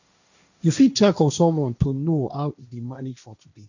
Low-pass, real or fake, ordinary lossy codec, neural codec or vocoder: 7.2 kHz; fake; none; codec, 16 kHz, 1.1 kbps, Voila-Tokenizer